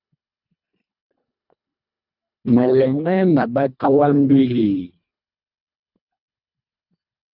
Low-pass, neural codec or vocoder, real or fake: 5.4 kHz; codec, 24 kHz, 1.5 kbps, HILCodec; fake